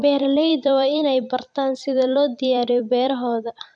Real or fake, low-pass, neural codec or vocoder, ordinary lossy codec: fake; 9.9 kHz; vocoder, 44.1 kHz, 128 mel bands every 256 samples, BigVGAN v2; none